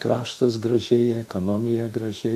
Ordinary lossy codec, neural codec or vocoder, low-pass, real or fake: AAC, 96 kbps; autoencoder, 48 kHz, 32 numbers a frame, DAC-VAE, trained on Japanese speech; 14.4 kHz; fake